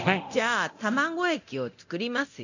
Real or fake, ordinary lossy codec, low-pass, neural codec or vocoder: fake; none; 7.2 kHz; codec, 24 kHz, 0.9 kbps, DualCodec